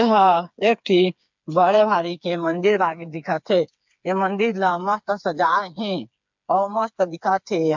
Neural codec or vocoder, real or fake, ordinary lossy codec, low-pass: codec, 16 kHz, 4 kbps, FreqCodec, smaller model; fake; MP3, 64 kbps; 7.2 kHz